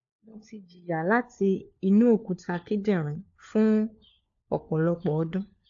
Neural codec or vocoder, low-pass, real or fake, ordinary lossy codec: codec, 16 kHz, 4 kbps, FunCodec, trained on LibriTTS, 50 frames a second; 7.2 kHz; fake; AAC, 48 kbps